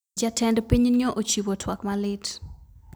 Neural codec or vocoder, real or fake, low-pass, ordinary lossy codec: none; real; none; none